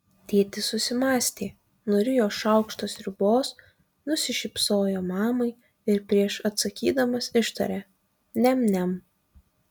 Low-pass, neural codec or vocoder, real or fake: 19.8 kHz; none; real